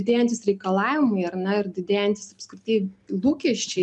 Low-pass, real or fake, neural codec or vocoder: 10.8 kHz; real; none